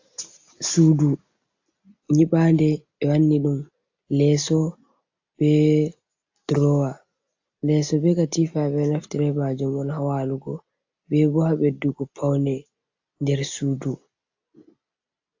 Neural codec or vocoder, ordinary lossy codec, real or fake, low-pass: none; AAC, 48 kbps; real; 7.2 kHz